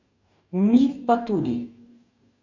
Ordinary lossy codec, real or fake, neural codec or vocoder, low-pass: none; fake; codec, 44.1 kHz, 2.6 kbps, DAC; 7.2 kHz